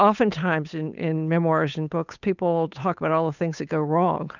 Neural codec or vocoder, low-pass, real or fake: codec, 16 kHz, 8 kbps, FunCodec, trained on Chinese and English, 25 frames a second; 7.2 kHz; fake